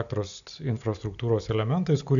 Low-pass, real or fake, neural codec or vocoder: 7.2 kHz; real; none